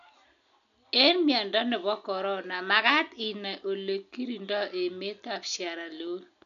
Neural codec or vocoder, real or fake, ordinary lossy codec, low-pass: none; real; none; 7.2 kHz